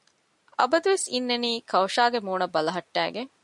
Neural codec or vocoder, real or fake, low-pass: none; real; 10.8 kHz